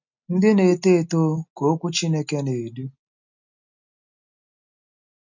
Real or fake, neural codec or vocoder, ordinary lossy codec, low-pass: real; none; none; 7.2 kHz